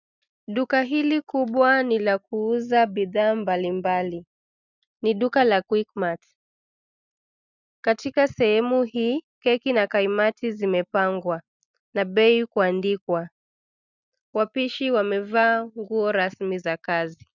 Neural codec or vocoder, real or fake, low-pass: none; real; 7.2 kHz